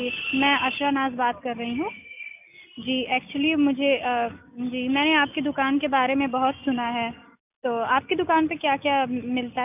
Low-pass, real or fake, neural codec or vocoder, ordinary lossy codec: 3.6 kHz; real; none; none